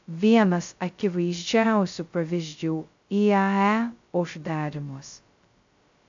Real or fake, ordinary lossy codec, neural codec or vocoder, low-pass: fake; AAC, 64 kbps; codec, 16 kHz, 0.2 kbps, FocalCodec; 7.2 kHz